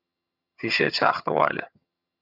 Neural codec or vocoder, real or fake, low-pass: vocoder, 22.05 kHz, 80 mel bands, HiFi-GAN; fake; 5.4 kHz